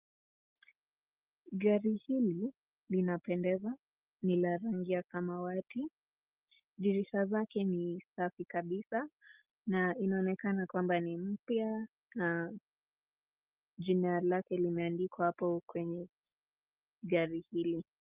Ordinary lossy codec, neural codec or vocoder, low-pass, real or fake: Opus, 24 kbps; none; 3.6 kHz; real